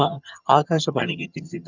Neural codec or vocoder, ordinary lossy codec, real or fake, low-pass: vocoder, 22.05 kHz, 80 mel bands, HiFi-GAN; none; fake; 7.2 kHz